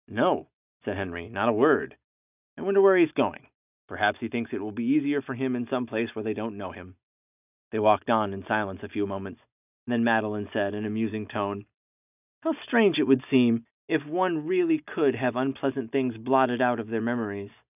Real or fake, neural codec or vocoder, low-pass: real; none; 3.6 kHz